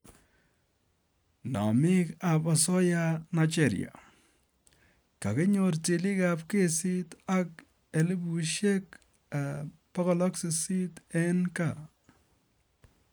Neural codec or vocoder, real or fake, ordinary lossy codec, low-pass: none; real; none; none